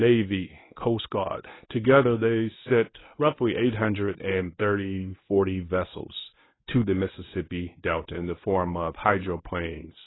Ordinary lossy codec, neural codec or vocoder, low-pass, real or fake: AAC, 16 kbps; codec, 24 kHz, 0.9 kbps, WavTokenizer, medium speech release version 1; 7.2 kHz; fake